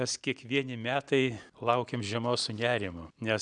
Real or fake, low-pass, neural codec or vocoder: real; 9.9 kHz; none